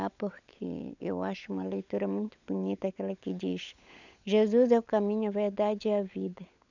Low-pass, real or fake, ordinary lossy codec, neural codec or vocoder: 7.2 kHz; fake; none; codec, 16 kHz, 16 kbps, FunCodec, trained on LibriTTS, 50 frames a second